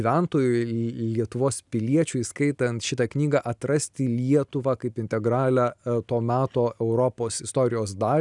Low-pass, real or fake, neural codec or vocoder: 10.8 kHz; real; none